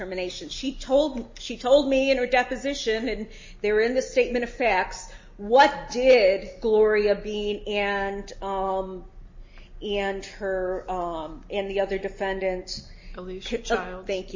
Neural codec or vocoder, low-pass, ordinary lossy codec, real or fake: none; 7.2 kHz; MP3, 32 kbps; real